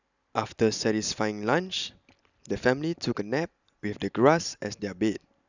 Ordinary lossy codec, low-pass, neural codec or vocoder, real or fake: none; 7.2 kHz; none; real